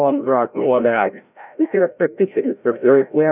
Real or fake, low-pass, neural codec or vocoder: fake; 3.6 kHz; codec, 16 kHz, 0.5 kbps, FreqCodec, larger model